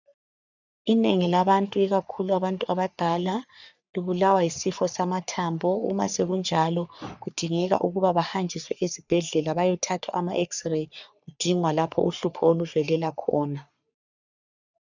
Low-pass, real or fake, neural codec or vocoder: 7.2 kHz; fake; codec, 44.1 kHz, 3.4 kbps, Pupu-Codec